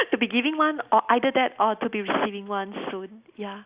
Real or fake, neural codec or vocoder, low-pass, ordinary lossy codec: real; none; 3.6 kHz; Opus, 64 kbps